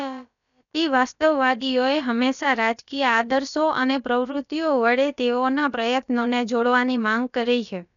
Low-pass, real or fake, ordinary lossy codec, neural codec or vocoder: 7.2 kHz; fake; none; codec, 16 kHz, about 1 kbps, DyCAST, with the encoder's durations